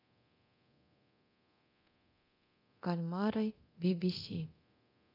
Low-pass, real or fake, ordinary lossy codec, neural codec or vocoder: 5.4 kHz; fake; AAC, 32 kbps; codec, 24 kHz, 0.9 kbps, DualCodec